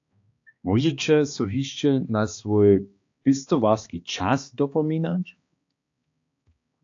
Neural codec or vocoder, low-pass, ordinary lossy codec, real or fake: codec, 16 kHz, 2 kbps, X-Codec, HuBERT features, trained on balanced general audio; 7.2 kHz; AAC, 48 kbps; fake